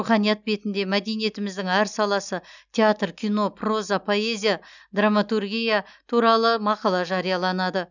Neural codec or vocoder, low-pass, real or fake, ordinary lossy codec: none; 7.2 kHz; real; none